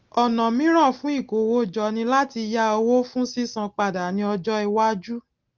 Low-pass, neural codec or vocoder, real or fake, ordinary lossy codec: 7.2 kHz; none; real; Opus, 32 kbps